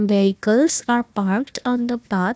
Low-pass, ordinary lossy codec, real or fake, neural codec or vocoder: none; none; fake; codec, 16 kHz, 1 kbps, FunCodec, trained on Chinese and English, 50 frames a second